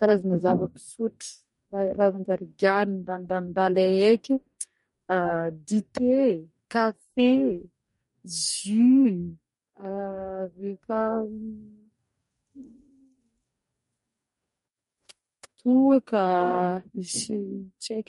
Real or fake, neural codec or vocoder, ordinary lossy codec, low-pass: fake; codec, 44.1 kHz, 2.6 kbps, DAC; MP3, 48 kbps; 19.8 kHz